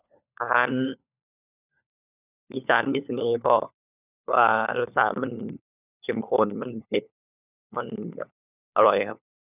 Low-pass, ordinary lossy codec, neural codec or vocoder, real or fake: 3.6 kHz; none; codec, 16 kHz, 4 kbps, FunCodec, trained on LibriTTS, 50 frames a second; fake